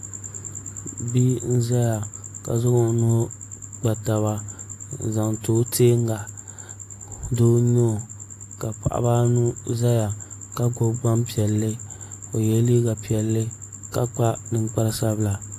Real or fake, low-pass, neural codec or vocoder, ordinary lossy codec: real; 14.4 kHz; none; AAC, 64 kbps